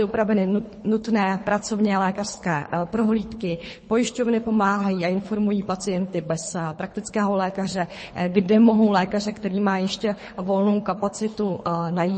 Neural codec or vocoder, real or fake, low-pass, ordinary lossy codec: codec, 24 kHz, 3 kbps, HILCodec; fake; 10.8 kHz; MP3, 32 kbps